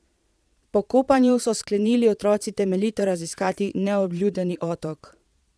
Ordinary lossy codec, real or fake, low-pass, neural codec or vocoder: none; fake; none; vocoder, 22.05 kHz, 80 mel bands, Vocos